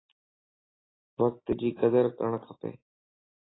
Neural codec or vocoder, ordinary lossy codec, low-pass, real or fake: none; AAC, 16 kbps; 7.2 kHz; real